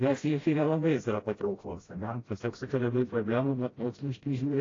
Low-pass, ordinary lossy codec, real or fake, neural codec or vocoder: 7.2 kHz; AAC, 32 kbps; fake; codec, 16 kHz, 0.5 kbps, FreqCodec, smaller model